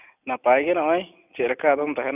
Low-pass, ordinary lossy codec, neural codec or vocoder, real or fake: 3.6 kHz; none; none; real